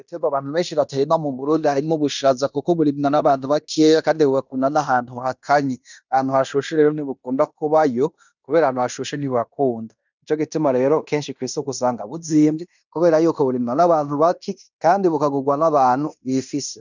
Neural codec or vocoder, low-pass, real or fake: codec, 16 kHz in and 24 kHz out, 0.9 kbps, LongCat-Audio-Codec, fine tuned four codebook decoder; 7.2 kHz; fake